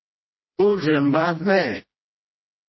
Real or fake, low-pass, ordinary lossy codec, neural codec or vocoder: fake; 7.2 kHz; MP3, 24 kbps; codec, 16 kHz, 1 kbps, FreqCodec, smaller model